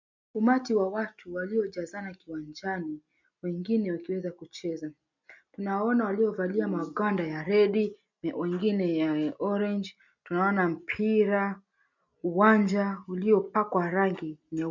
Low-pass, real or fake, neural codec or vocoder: 7.2 kHz; real; none